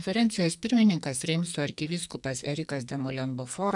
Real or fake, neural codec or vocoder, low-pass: fake; codec, 44.1 kHz, 2.6 kbps, SNAC; 10.8 kHz